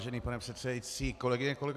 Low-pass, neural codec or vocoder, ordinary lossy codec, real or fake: 14.4 kHz; none; AAC, 96 kbps; real